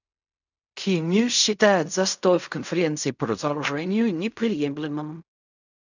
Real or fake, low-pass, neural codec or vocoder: fake; 7.2 kHz; codec, 16 kHz in and 24 kHz out, 0.4 kbps, LongCat-Audio-Codec, fine tuned four codebook decoder